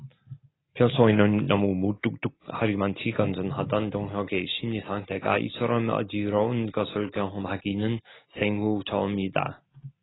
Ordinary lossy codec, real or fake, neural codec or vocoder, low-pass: AAC, 16 kbps; real; none; 7.2 kHz